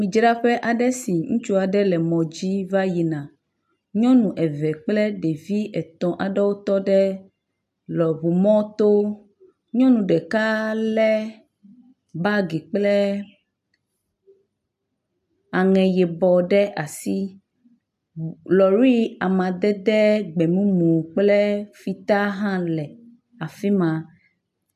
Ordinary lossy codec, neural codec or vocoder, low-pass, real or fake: AAC, 96 kbps; none; 14.4 kHz; real